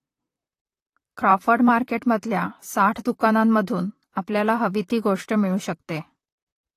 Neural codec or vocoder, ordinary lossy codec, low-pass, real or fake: vocoder, 44.1 kHz, 128 mel bands, Pupu-Vocoder; AAC, 48 kbps; 14.4 kHz; fake